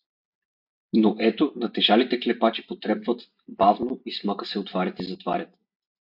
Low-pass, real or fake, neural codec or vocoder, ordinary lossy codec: 5.4 kHz; real; none; AAC, 48 kbps